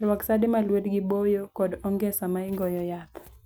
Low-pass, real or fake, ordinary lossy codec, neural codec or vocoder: none; real; none; none